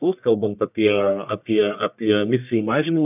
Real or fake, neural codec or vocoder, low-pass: fake; codec, 44.1 kHz, 1.7 kbps, Pupu-Codec; 3.6 kHz